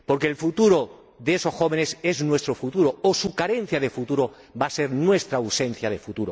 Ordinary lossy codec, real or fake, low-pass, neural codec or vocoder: none; real; none; none